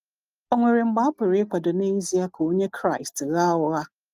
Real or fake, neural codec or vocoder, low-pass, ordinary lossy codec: real; none; 14.4 kHz; Opus, 32 kbps